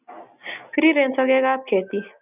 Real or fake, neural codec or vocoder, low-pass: real; none; 3.6 kHz